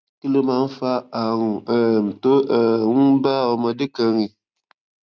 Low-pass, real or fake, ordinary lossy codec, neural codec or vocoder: none; real; none; none